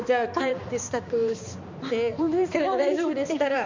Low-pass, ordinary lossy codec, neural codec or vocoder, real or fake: 7.2 kHz; MP3, 64 kbps; codec, 16 kHz, 2 kbps, X-Codec, HuBERT features, trained on balanced general audio; fake